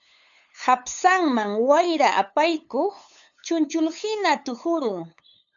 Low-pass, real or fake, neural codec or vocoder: 7.2 kHz; fake; codec, 16 kHz, 8 kbps, FunCodec, trained on LibriTTS, 25 frames a second